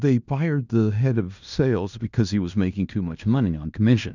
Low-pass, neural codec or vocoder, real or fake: 7.2 kHz; codec, 16 kHz in and 24 kHz out, 0.9 kbps, LongCat-Audio-Codec, fine tuned four codebook decoder; fake